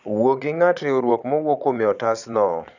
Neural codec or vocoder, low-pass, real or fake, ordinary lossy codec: vocoder, 22.05 kHz, 80 mel bands, Vocos; 7.2 kHz; fake; none